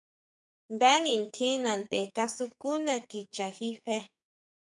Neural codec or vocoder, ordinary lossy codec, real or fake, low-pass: codec, 44.1 kHz, 2.6 kbps, SNAC; MP3, 96 kbps; fake; 10.8 kHz